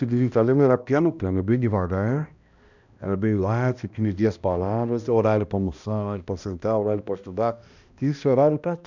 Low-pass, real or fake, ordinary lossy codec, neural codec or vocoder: 7.2 kHz; fake; none; codec, 16 kHz, 1 kbps, X-Codec, HuBERT features, trained on balanced general audio